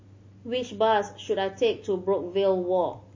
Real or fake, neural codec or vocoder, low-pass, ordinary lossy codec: real; none; 7.2 kHz; MP3, 32 kbps